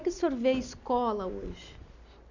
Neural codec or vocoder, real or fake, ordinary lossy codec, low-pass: none; real; none; 7.2 kHz